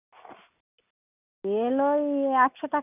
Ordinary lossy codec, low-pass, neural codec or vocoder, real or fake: none; 3.6 kHz; none; real